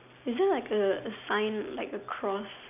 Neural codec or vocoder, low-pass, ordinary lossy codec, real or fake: none; 3.6 kHz; none; real